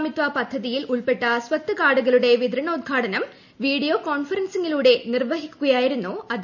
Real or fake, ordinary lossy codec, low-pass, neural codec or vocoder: real; none; 7.2 kHz; none